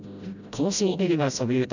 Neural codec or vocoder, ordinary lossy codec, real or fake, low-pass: codec, 16 kHz, 0.5 kbps, FreqCodec, smaller model; none; fake; 7.2 kHz